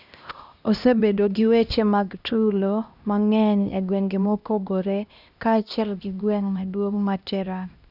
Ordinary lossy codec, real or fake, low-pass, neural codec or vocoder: none; fake; 5.4 kHz; codec, 16 kHz, 1 kbps, X-Codec, HuBERT features, trained on LibriSpeech